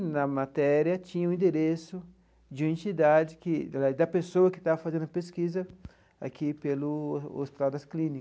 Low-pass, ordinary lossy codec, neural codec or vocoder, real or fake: none; none; none; real